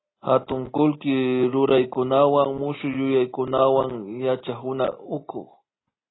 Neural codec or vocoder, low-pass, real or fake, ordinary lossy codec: none; 7.2 kHz; real; AAC, 16 kbps